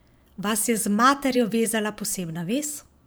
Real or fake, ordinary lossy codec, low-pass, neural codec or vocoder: fake; none; none; vocoder, 44.1 kHz, 128 mel bands every 512 samples, BigVGAN v2